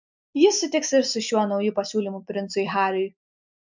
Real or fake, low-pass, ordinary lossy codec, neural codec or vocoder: real; 7.2 kHz; MP3, 64 kbps; none